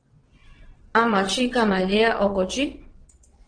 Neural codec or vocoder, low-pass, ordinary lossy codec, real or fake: vocoder, 22.05 kHz, 80 mel bands, WaveNeXt; 9.9 kHz; Opus, 16 kbps; fake